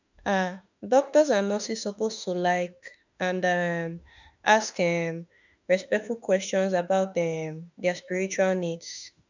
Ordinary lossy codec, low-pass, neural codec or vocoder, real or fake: none; 7.2 kHz; autoencoder, 48 kHz, 32 numbers a frame, DAC-VAE, trained on Japanese speech; fake